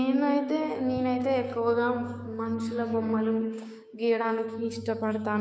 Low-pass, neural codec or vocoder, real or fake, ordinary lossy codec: none; codec, 16 kHz, 6 kbps, DAC; fake; none